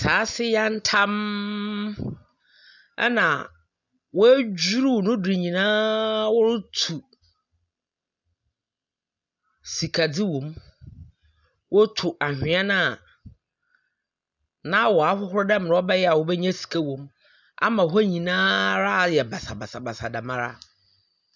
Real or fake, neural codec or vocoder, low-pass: real; none; 7.2 kHz